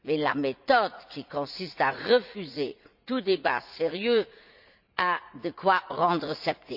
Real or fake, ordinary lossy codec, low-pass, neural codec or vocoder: fake; Opus, 64 kbps; 5.4 kHz; vocoder, 44.1 kHz, 80 mel bands, Vocos